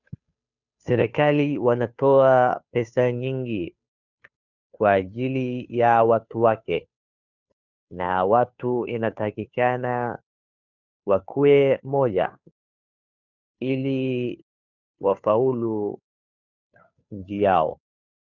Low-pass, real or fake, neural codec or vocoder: 7.2 kHz; fake; codec, 16 kHz, 2 kbps, FunCodec, trained on Chinese and English, 25 frames a second